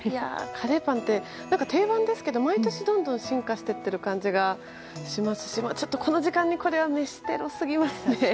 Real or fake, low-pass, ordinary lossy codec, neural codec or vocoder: real; none; none; none